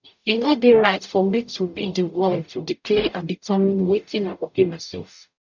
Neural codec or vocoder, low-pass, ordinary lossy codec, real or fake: codec, 44.1 kHz, 0.9 kbps, DAC; 7.2 kHz; none; fake